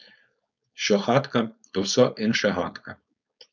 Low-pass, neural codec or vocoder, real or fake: 7.2 kHz; codec, 16 kHz, 4.8 kbps, FACodec; fake